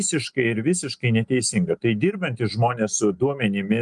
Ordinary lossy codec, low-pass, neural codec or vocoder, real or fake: Opus, 32 kbps; 10.8 kHz; none; real